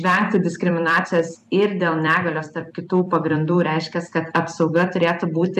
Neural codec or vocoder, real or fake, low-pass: vocoder, 44.1 kHz, 128 mel bands every 256 samples, BigVGAN v2; fake; 14.4 kHz